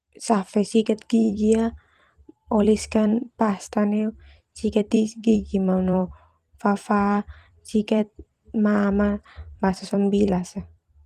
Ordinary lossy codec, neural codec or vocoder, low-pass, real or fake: Opus, 24 kbps; vocoder, 44.1 kHz, 128 mel bands every 256 samples, BigVGAN v2; 14.4 kHz; fake